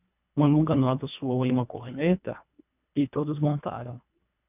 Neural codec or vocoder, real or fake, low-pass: codec, 24 kHz, 1.5 kbps, HILCodec; fake; 3.6 kHz